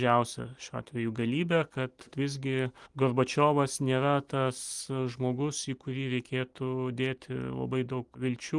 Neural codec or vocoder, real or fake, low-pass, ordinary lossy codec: none; real; 10.8 kHz; Opus, 24 kbps